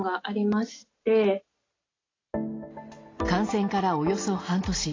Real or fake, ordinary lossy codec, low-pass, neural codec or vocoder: real; AAC, 32 kbps; 7.2 kHz; none